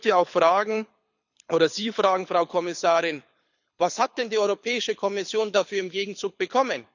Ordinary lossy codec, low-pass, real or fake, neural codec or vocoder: none; 7.2 kHz; fake; codec, 24 kHz, 6 kbps, HILCodec